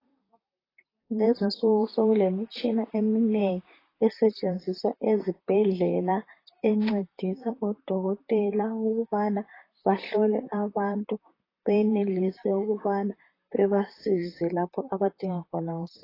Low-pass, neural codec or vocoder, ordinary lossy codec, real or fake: 5.4 kHz; vocoder, 44.1 kHz, 128 mel bands, Pupu-Vocoder; AAC, 24 kbps; fake